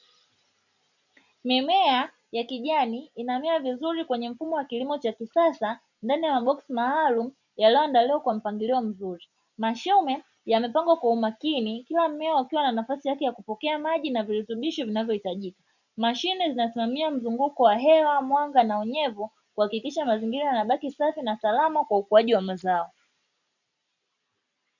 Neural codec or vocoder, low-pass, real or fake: none; 7.2 kHz; real